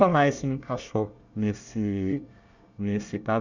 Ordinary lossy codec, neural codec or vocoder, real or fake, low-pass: none; codec, 24 kHz, 1 kbps, SNAC; fake; 7.2 kHz